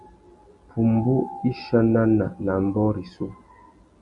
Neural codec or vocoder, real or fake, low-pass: none; real; 10.8 kHz